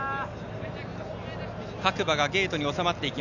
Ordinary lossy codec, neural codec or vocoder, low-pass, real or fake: none; none; 7.2 kHz; real